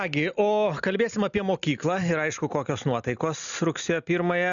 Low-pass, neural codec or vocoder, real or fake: 7.2 kHz; none; real